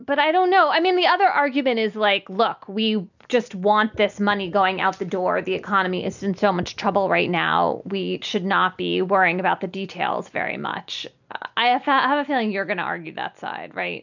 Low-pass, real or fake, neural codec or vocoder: 7.2 kHz; real; none